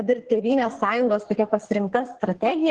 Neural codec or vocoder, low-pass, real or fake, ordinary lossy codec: codec, 44.1 kHz, 2.6 kbps, SNAC; 10.8 kHz; fake; Opus, 16 kbps